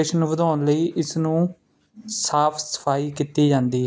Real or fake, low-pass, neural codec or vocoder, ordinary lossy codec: real; none; none; none